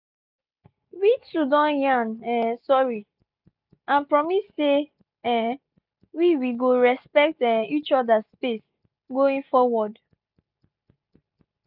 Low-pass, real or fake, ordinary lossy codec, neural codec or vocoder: 5.4 kHz; real; none; none